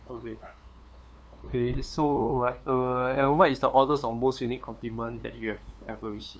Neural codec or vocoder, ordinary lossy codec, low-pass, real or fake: codec, 16 kHz, 2 kbps, FunCodec, trained on LibriTTS, 25 frames a second; none; none; fake